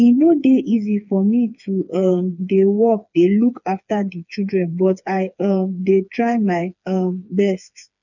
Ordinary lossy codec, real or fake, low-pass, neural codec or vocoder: none; fake; 7.2 kHz; codec, 16 kHz, 4 kbps, FreqCodec, smaller model